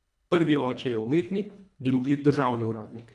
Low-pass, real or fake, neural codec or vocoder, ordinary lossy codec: none; fake; codec, 24 kHz, 1.5 kbps, HILCodec; none